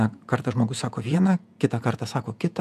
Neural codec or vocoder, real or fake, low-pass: vocoder, 48 kHz, 128 mel bands, Vocos; fake; 14.4 kHz